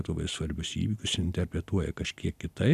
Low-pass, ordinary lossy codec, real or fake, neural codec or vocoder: 14.4 kHz; AAC, 96 kbps; real; none